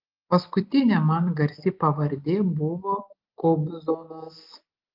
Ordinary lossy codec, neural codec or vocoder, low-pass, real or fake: Opus, 32 kbps; none; 5.4 kHz; real